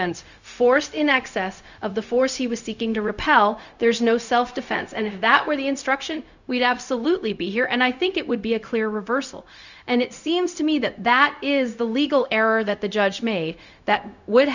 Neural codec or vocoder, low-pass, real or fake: codec, 16 kHz, 0.4 kbps, LongCat-Audio-Codec; 7.2 kHz; fake